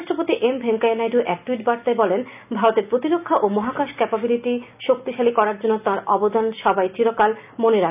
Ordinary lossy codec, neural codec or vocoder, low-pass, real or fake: none; none; 3.6 kHz; real